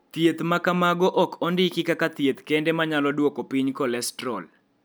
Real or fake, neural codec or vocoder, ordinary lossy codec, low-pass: real; none; none; none